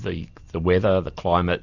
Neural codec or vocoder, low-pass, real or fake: none; 7.2 kHz; real